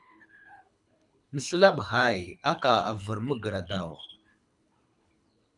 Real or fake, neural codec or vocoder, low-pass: fake; codec, 24 kHz, 3 kbps, HILCodec; 10.8 kHz